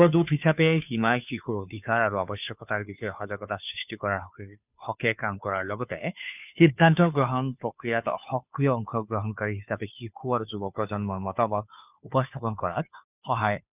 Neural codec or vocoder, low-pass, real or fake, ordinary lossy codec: codec, 16 kHz, 2 kbps, FunCodec, trained on Chinese and English, 25 frames a second; 3.6 kHz; fake; none